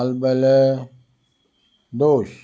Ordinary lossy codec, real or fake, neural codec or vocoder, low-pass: none; real; none; none